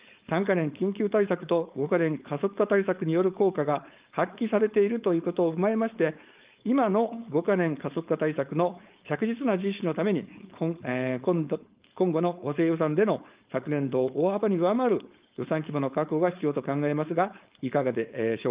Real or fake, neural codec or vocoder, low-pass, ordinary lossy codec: fake; codec, 16 kHz, 4.8 kbps, FACodec; 3.6 kHz; Opus, 32 kbps